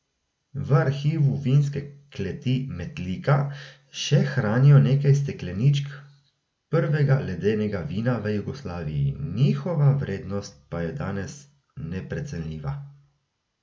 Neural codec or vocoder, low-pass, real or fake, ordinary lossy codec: none; none; real; none